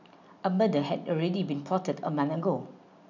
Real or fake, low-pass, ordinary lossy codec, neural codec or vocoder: real; 7.2 kHz; none; none